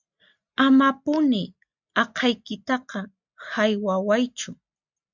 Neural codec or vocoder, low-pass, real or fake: none; 7.2 kHz; real